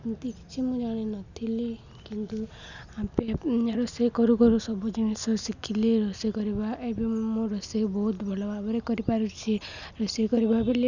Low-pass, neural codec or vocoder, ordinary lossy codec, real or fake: 7.2 kHz; none; none; real